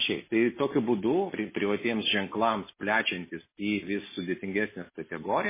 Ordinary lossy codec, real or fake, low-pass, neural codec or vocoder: MP3, 16 kbps; real; 3.6 kHz; none